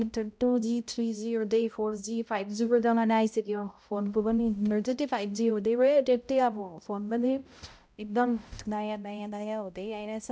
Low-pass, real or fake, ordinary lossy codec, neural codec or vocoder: none; fake; none; codec, 16 kHz, 0.5 kbps, X-Codec, HuBERT features, trained on balanced general audio